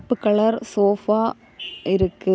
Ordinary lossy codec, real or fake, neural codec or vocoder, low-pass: none; real; none; none